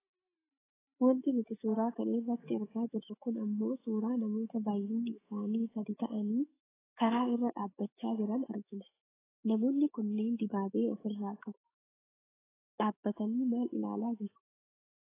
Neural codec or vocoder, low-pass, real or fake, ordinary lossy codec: autoencoder, 48 kHz, 128 numbers a frame, DAC-VAE, trained on Japanese speech; 3.6 kHz; fake; AAC, 16 kbps